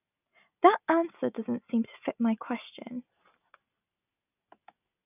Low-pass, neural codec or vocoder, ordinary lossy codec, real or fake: 3.6 kHz; none; none; real